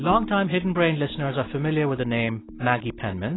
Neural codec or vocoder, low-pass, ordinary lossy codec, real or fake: none; 7.2 kHz; AAC, 16 kbps; real